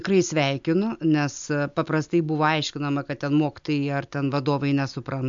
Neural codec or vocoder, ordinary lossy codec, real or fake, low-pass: none; MP3, 64 kbps; real; 7.2 kHz